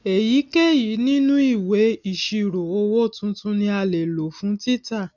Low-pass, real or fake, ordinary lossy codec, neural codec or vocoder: 7.2 kHz; real; none; none